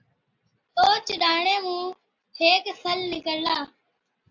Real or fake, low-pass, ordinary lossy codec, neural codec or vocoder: real; 7.2 kHz; AAC, 48 kbps; none